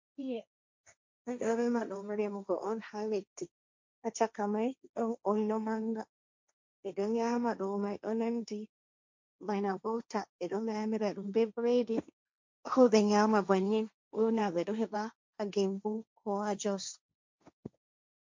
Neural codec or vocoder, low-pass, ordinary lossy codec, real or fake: codec, 16 kHz, 1.1 kbps, Voila-Tokenizer; 7.2 kHz; MP3, 48 kbps; fake